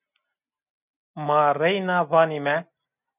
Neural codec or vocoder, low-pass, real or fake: none; 3.6 kHz; real